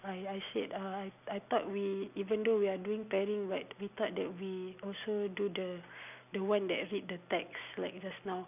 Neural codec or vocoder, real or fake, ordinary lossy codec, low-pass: none; real; none; 3.6 kHz